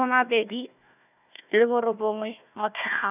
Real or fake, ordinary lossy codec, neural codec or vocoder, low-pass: fake; none; codec, 16 kHz, 1 kbps, FunCodec, trained on Chinese and English, 50 frames a second; 3.6 kHz